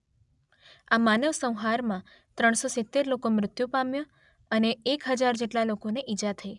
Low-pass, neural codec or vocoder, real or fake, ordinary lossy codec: 10.8 kHz; none; real; none